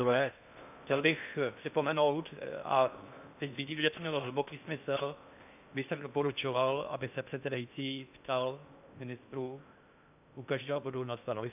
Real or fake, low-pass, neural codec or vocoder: fake; 3.6 kHz; codec, 16 kHz in and 24 kHz out, 0.6 kbps, FocalCodec, streaming, 4096 codes